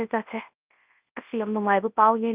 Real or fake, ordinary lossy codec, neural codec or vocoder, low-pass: fake; Opus, 24 kbps; codec, 24 kHz, 0.9 kbps, WavTokenizer, large speech release; 3.6 kHz